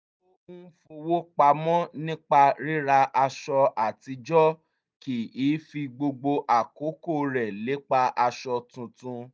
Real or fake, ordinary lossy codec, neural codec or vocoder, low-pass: real; none; none; none